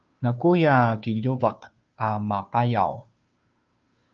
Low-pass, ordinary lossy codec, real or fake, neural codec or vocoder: 7.2 kHz; Opus, 24 kbps; fake; codec, 16 kHz, 2 kbps, FunCodec, trained on Chinese and English, 25 frames a second